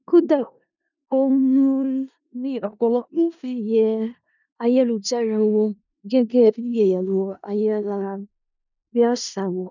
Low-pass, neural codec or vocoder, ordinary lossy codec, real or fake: 7.2 kHz; codec, 16 kHz in and 24 kHz out, 0.4 kbps, LongCat-Audio-Codec, four codebook decoder; none; fake